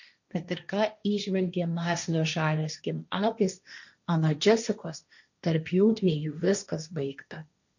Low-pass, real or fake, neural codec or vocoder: 7.2 kHz; fake; codec, 16 kHz, 1.1 kbps, Voila-Tokenizer